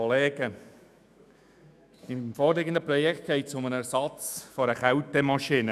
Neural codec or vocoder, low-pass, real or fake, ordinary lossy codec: autoencoder, 48 kHz, 128 numbers a frame, DAC-VAE, trained on Japanese speech; 14.4 kHz; fake; none